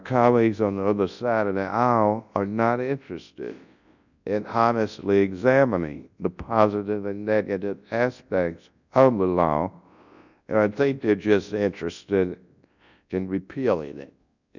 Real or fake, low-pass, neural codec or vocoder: fake; 7.2 kHz; codec, 24 kHz, 0.9 kbps, WavTokenizer, large speech release